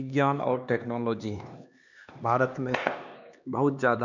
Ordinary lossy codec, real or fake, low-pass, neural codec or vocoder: none; fake; 7.2 kHz; codec, 16 kHz, 2 kbps, X-Codec, HuBERT features, trained on LibriSpeech